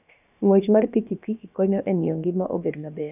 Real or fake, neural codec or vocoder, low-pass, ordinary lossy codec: fake; codec, 16 kHz, about 1 kbps, DyCAST, with the encoder's durations; 3.6 kHz; none